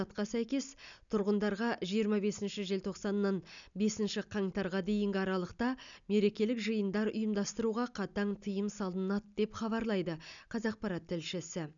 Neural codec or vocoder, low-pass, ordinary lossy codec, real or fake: none; 7.2 kHz; none; real